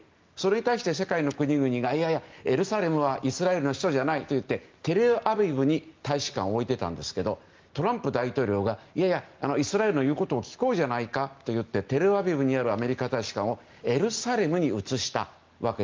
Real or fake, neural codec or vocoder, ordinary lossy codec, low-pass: real; none; Opus, 32 kbps; 7.2 kHz